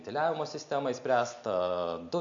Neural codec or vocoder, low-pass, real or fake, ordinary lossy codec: none; 7.2 kHz; real; MP3, 64 kbps